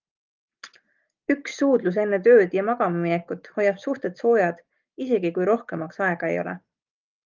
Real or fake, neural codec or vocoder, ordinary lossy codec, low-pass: real; none; Opus, 24 kbps; 7.2 kHz